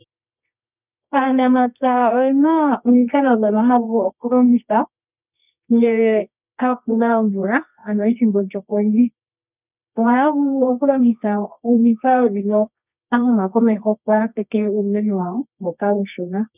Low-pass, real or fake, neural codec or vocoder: 3.6 kHz; fake; codec, 24 kHz, 0.9 kbps, WavTokenizer, medium music audio release